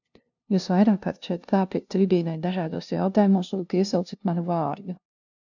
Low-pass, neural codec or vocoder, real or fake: 7.2 kHz; codec, 16 kHz, 0.5 kbps, FunCodec, trained on LibriTTS, 25 frames a second; fake